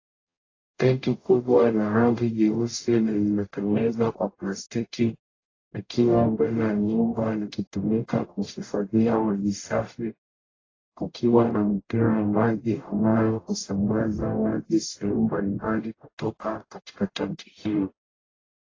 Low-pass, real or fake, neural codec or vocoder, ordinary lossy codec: 7.2 kHz; fake; codec, 44.1 kHz, 0.9 kbps, DAC; AAC, 32 kbps